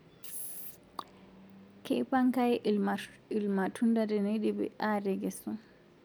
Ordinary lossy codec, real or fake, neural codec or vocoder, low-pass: none; real; none; none